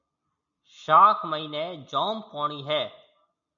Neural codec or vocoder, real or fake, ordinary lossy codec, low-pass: none; real; MP3, 64 kbps; 7.2 kHz